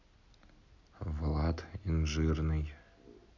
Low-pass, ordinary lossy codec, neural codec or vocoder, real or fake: 7.2 kHz; none; none; real